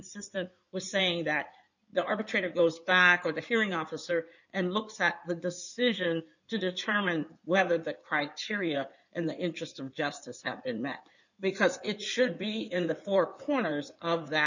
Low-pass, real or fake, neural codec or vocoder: 7.2 kHz; fake; codec, 16 kHz in and 24 kHz out, 2.2 kbps, FireRedTTS-2 codec